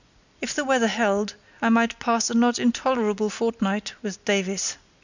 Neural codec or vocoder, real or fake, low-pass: none; real; 7.2 kHz